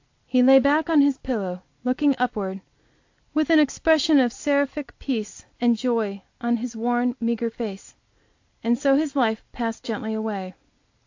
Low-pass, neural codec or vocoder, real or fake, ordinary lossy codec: 7.2 kHz; none; real; AAC, 48 kbps